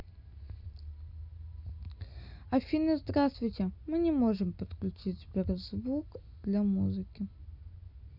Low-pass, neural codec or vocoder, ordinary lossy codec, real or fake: 5.4 kHz; none; none; real